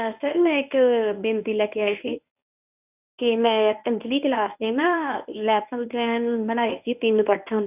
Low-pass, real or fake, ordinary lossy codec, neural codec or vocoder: 3.6 kHz; fake; none; codec, 24 kHz, 0.9 kbps, WavTokenizer, medium speech release version 1